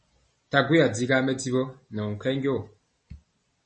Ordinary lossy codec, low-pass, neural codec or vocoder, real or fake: MP3, 32 kbps; 10.8 kHz; none; real